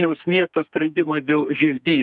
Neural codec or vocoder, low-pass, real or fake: codec, 32 kHz, 1.9 kbps, SNAC; 10.8 kHz; fake